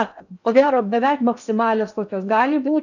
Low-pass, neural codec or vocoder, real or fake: 7.2 kHz; codec, 16 kHz in and 24 kHz out, 0.8 kbps, FocalCodec, streaming, 65536 codes; fake